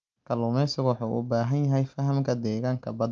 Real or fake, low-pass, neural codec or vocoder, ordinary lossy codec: real; 7.2 kHz; none; Opus, 24 kbps